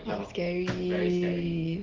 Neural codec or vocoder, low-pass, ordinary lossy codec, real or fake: none; 7.2 kHz; Opus, 16 kbps; real